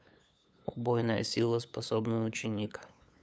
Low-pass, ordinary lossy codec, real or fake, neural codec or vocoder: none; none; fake; codec, 16 kHz, 8 kbps, FunCodec, trained on LibriTTS, 25 frames a second